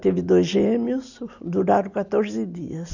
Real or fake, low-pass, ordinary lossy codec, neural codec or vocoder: real; 7.2 kHz; none; none